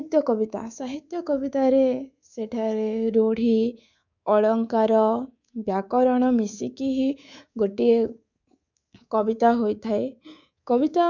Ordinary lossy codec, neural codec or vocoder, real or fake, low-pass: none; codec, 24 kHz, 3.1 kbps, DualCodec; fake; 7.2 kHz